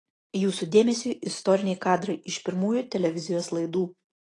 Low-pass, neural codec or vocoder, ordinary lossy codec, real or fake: 10.8 kHz; none; AAC, 32 kbps; real